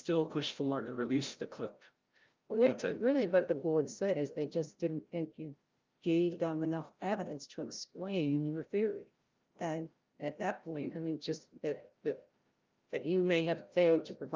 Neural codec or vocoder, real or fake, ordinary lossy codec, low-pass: codec, 16 kHz, 0.5 kbps, FreqCodec, larger model; fake; Opus, 24 kbps; 7.2 kHz